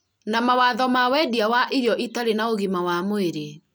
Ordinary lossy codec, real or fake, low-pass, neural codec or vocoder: none; real; none; none